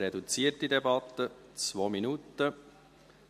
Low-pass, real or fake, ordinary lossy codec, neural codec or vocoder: 14.4 kHz; real; MP3, 64 kbps; none